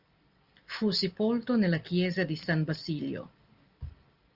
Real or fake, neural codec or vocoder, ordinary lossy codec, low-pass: real; none; Opus, 32 kbps; 5.4 kHz